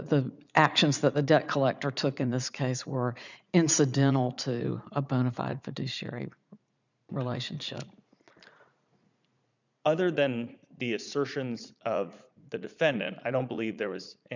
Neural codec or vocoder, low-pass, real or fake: vocoder, 44.1 kHz, 80 mel bands, Vocos; 7.2 kHz; fake